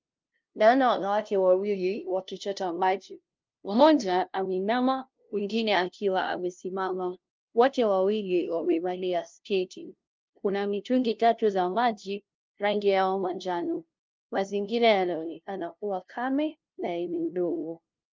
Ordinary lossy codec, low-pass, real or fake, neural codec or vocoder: Opus, 24 kbps; 7.2 kHz; fake; codec, 16 kHz, 0.5 kbps, FunCodec, trained on LibriTTS, 25 frames a second